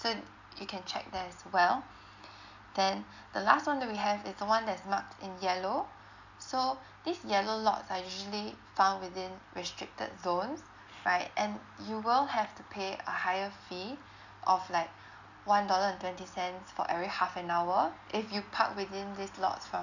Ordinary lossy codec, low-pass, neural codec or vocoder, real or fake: none; 7.2 kHz; none; real